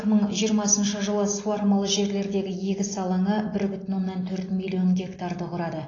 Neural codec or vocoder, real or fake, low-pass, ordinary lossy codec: none; real; 7.2 kHz; AAC, 32 kbps